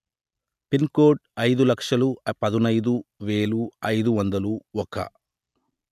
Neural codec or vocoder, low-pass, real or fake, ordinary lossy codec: none; 14.4 kHz; real; none